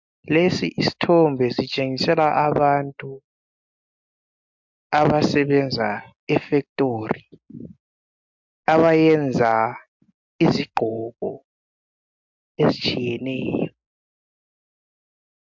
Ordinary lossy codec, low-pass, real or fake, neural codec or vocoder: MP3, 64 kbps; 7.2 kHz; real; none